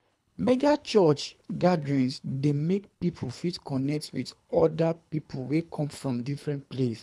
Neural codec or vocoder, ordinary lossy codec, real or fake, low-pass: codec, 24 kHz, 3 kbps, HILCodec; none; fake; none